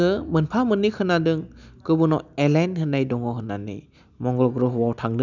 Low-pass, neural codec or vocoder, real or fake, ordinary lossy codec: 7.2 kHz; none; real; none